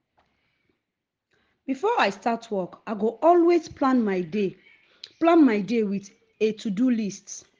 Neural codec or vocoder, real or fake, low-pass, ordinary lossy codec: none; real; 7.2 kHz; Opus, 16 kbps